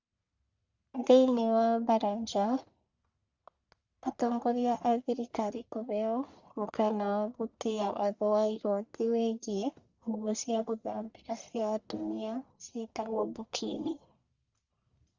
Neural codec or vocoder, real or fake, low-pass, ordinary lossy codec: codec, 44.1 kHz, 1.7 kbps, Pupu-Codec; fake; 7.2 kHz; Opus, 64 kbps